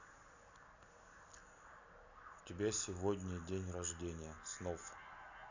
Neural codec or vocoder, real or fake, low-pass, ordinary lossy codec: none; real; 7.2 kHz; none